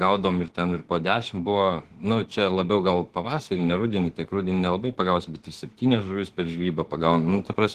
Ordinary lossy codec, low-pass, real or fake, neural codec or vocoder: Opus, 16 kbps; 14.4 kHz; fake; autoencoder, 48 kHz, 32 numbers a frame, DAC-VAE, trained on Japanese speech